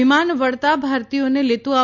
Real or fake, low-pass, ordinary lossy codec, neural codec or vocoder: real; 7.2 kHz; none; none